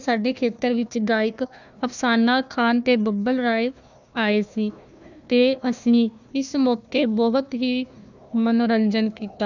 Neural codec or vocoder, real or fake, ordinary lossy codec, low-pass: codec, 16 kHz, 1 kbps, FunCodec, trained on Chinese and English, 50 frames a second; fake; none; 7.2 kHz